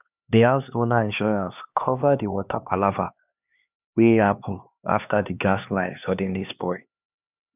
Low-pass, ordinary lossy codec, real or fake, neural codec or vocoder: 3.6 kHz; none; fake; codec, 16 kHz, 2 kbps, X-Codec, HuBERT features, trained on LibriSpeech